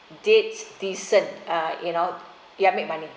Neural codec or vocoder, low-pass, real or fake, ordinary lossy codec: none; none; real; none